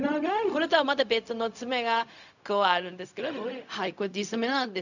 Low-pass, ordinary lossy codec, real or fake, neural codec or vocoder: 7.2 kHz; none; fake; codec, 16 kHz, 0.4 kbps, LongCat-Audio-Codec